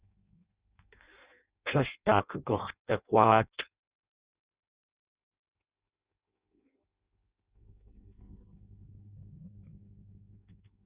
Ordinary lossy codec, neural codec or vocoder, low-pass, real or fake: Opus, 64 kbps; codec, 16 kHz in and 24 kHz out, 0.6 kbps, FireRedTTS-2 codec; 3.6 kHz; fake